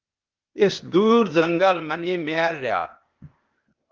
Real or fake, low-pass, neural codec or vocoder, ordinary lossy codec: fake; 7.2 kHz; codec, 16 kHz, 0.8 kbps, ZipCodec; Opus, 24 kbps